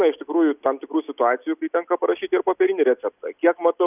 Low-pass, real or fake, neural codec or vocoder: 3.6 kHz; real; none